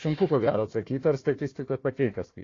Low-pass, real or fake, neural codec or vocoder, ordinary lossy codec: 7.2 kHz; fake; codec, 16 kHz, 1 kbps, FunCodec, trained on Chinese and English, 50 frames a second; AAC, 32 kbps